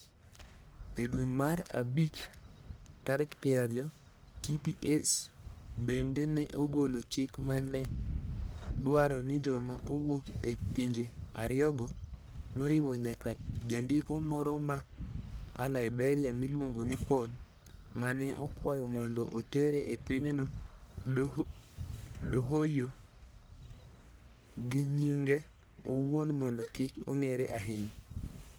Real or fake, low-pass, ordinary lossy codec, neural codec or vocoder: fake; none; none; codec, 44.1 kHz, 1.7 kbps, Pupu-Codec